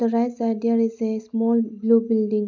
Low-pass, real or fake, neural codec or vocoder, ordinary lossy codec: 7.2 kHz; real; none; MP3, 64 kbps